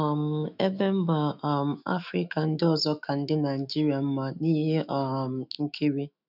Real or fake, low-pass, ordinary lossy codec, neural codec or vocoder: fake; 5.4 kHz; none; autoencoder, 48 kHz, 128 numbers a frame, DAC-VAE, trained on Japanese speech